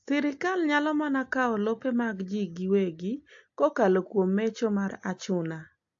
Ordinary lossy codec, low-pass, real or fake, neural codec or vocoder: AAC, 48 kbps; 7.2 kHz; real; none